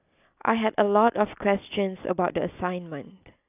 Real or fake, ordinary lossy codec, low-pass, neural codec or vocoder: real; AAC, 32 kbps; 3.6 kHz; none